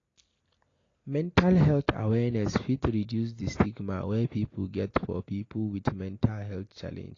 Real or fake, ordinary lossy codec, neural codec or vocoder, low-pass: real; AAC, 32 kbps; none; 7.2 kHz